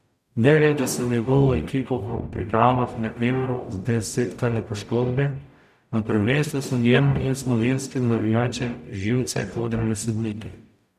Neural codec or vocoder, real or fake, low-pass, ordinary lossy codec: codec, 44.1 kHz, 0.9 kbps, DAC; fake; 14.4 kHz; none